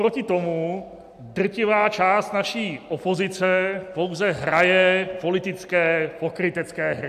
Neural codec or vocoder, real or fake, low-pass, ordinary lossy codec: none; real; 14.4 kHz; AAC, 96 kbps